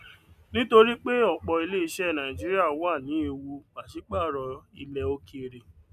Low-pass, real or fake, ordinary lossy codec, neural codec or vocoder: 14.4 kHz; real; none; none